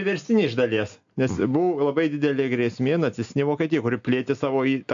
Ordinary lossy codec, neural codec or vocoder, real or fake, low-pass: AAC, 64 kbps; none; real; 7.2 kHz